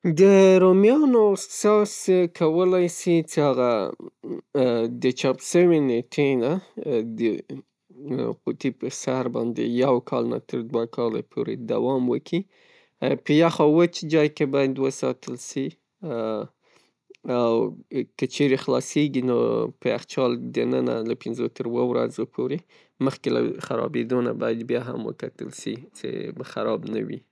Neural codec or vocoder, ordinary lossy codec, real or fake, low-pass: none; none; real; none